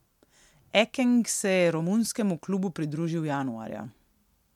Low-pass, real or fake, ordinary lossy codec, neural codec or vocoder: 19.8 kHz; real; MP3, 96 kbps; none